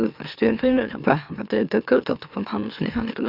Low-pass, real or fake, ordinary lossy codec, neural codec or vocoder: 5.4 kHz; fake; AAC, 48 kbps; autoencoder, 44.1 kHz, a latent of 192 numbers a frame, MeloTTS